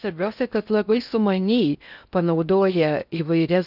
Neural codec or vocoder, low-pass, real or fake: codec, 16 kHz in and 24 kHz out, 0.6 kbps, FocalCodec, streaming, 4096 codes; 5.4 kHz; fake